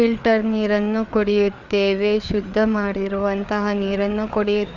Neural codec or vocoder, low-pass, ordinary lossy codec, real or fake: codec, 16 kHz, 4 kbps, FreqCodec, larger model; 7.2 kHz; Opus, 64 kbps; fake